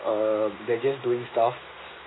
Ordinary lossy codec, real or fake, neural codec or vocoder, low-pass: AAC, 16 kbps; real; none; 7.2 kHz